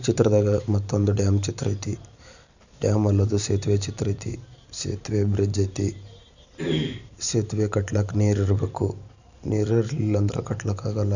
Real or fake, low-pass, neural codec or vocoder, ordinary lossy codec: fake; 7.2 kHz; vocoder, 44.1 kHz, 128 mel bands every 512 samples, BigVGAN v2; none